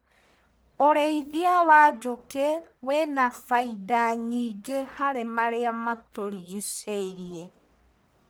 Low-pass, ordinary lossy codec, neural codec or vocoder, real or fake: none; none; codec, 44.1 kHz, 1.7 kbps, Pupu-Codec; fake